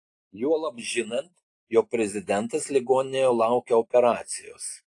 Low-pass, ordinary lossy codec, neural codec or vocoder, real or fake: 10.8 kHz; AAC, 48 kbps; none; real